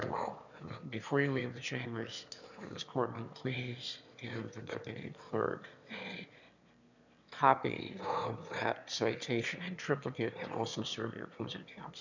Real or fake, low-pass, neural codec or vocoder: fake; 7.2 kHz; autoencoder, 22.05 kHz, a latent of 192 numbers a frame, VITS, trained on one speaker